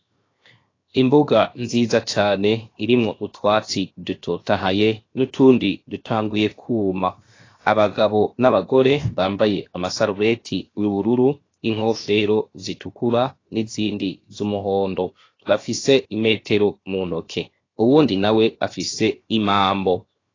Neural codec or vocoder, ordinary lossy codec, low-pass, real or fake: codec, 16 kHz, 0.7 kbps, FocalCodec; AAC, 32 kbps; 7.2 kHz; fake